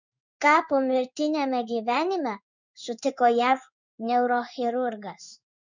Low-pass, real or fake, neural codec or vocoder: 7.2 kHz; fake; codec, 16 kHz in and 24 kHz out, 1 kbps, XY-Tokenizer